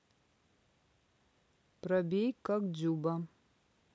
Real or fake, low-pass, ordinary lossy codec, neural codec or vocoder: real; none; none; none